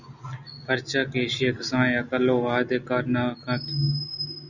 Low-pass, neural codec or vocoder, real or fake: 7.2 kHz; none; real